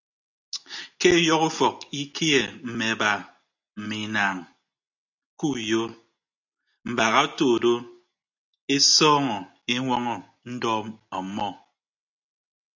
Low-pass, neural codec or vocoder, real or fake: 7.2 kHz; none; real